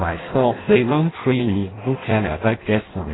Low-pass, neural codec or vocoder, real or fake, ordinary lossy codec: 7.2 kHz; codec, 16 kHz in and 24 kHz out, 0.6 kbps, FireRedTTS-2 codec; fake; AAC, 16 kbps